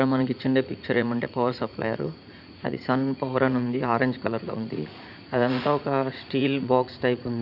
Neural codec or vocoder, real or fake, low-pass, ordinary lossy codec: vocoder, 44.1 kHz, 80 mel bands, Vocos; fake; 5.4 kHz; none